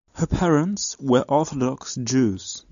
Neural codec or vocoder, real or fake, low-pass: none; real; 7.2 kHz